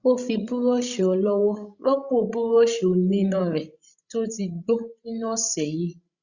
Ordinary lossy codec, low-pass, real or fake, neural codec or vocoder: Opus, 64 kbps; 7.2 kHz; fake; codec, 16 kHz, 16 kbps, FreqCodec, larger model